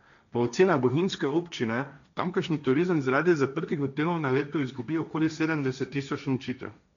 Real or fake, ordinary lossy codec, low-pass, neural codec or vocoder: fake; none; 7.2 kHz; codec, 16 kHz, 1.1 kbps, Voila-Tokenizer